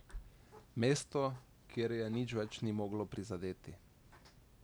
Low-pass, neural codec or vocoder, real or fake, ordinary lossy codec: none; none; real; none